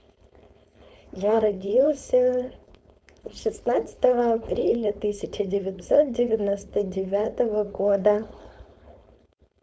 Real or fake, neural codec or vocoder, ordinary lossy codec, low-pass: fake; codec, 16 kHz, 4.8 kbps, FACodec; none; none